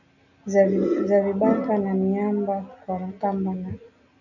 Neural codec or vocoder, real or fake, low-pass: none; real; 7.2 kHz